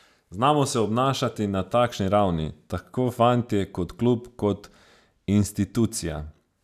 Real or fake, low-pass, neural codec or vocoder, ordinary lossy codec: real; 14.4 kHz; none; none